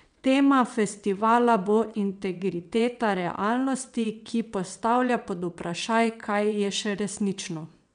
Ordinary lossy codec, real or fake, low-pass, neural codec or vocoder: none; fake; 9.9 kHz; vocoder, 22.05 kHz, 80 mel bands, WaveNeXt